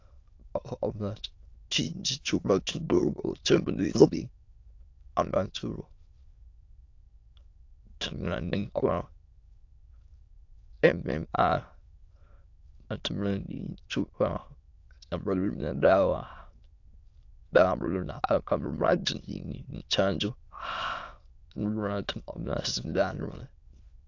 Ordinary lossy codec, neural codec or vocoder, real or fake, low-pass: AAC, 48 kbps; autoencoder, 22.05 kHz, a latent of 192 numbers a frame, VITS, trained on many speakers; fake; 7.2 kHz